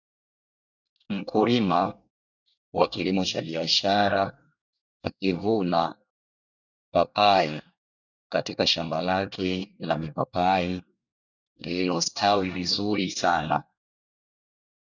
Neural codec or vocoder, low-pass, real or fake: codec, 24 kHz, 1 kbps, SNAC; 7.2 kHz; fake